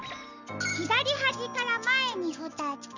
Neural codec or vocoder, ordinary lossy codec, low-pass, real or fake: none; Opus, 64 kbps; 7.2 kHz; real